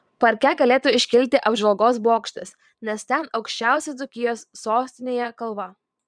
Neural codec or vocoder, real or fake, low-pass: vocoder, 22.05 kHz, 80 mel bands, Vocos; fake; 9.9 kHz